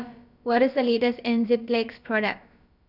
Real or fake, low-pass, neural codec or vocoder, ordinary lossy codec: fake; 5.4 kHz; codec, 16 kHz, about 1 kbps, DyCAST, with the encoder's durations; none